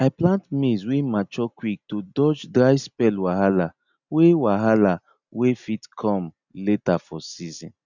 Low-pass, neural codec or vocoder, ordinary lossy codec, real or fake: 7.2 kHz; none; none; real